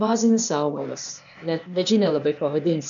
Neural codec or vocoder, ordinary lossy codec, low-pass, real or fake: codec, 16 kHz, 0.8 kbps, ZipCodec; MP3, 96 kbps; 7.2 kHz; fake